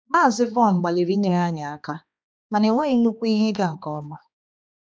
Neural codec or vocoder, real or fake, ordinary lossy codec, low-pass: codec, 16 kHz, 2 kbps, X-Codec, HuBERT features, trained on balanced general audio; fake; none; none